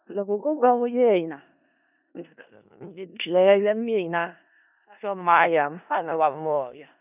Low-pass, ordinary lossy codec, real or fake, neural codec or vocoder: 3.6 kHz; none; fake; codec, 16 kHz in and 24 kHz out, 0.4 kbps, LongCat-Audio-Codec, four codebook decoder